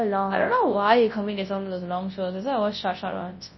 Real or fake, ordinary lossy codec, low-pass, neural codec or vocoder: fake; MP3, 24 kbps; 7.2 kHz; codec, 24 kHz, 0.9 kbps, WavTokenizer, large speech release